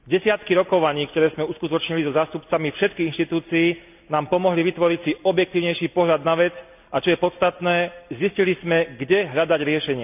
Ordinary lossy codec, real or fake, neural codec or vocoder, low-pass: none; real; none; 3.6 kHz